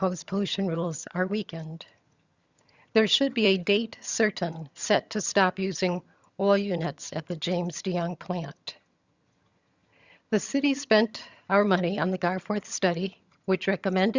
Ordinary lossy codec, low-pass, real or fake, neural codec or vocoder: Opus, 64 kbps; 7.2 kHz; fake; vocoder, 22.05 kHz, 80 mel bands, HiFi-GAN